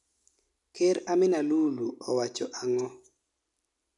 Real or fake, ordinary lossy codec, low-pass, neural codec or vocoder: real; none; 10.8 kHz; none